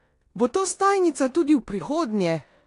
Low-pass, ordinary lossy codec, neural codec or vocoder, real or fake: 10.8 kHz; AAC, 48 kbps; codec, 16 kHz in and 24 kHz out, 0.9 kbps, LongCat-Audio-Codec, four codebook decoder; fake